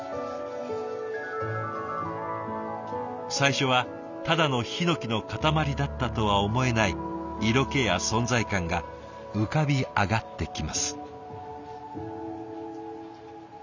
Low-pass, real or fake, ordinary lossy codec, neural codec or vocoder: 7.2 kHz; real; none; none